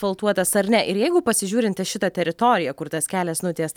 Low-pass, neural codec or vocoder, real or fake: 19.8 kHz; none; real